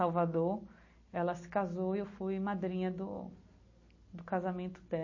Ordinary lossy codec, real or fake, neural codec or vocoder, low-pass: MP3, 32 kbps; real; none; 7.2 kHz